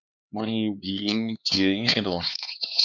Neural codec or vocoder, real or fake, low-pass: codec, 16 kHz, 2 kbps, X-Codec, HuBERT features, trained on LibriSpeech; fake; 7.2 kHz